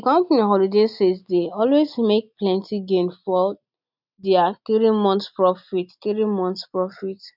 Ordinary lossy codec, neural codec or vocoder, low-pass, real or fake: none; none; 5.4 kHz; real